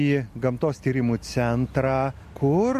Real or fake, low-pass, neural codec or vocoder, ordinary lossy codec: real; 14.4 kHz; none; MP3, 64 kbps